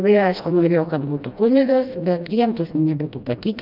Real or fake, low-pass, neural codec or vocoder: fake; 5.4 kHz; codec, 16 kHz, 1 kbps, FreqCodec, smaller model